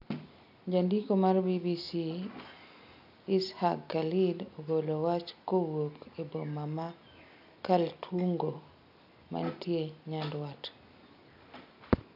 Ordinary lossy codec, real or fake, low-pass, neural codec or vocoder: none; real; 5.4 kHz; none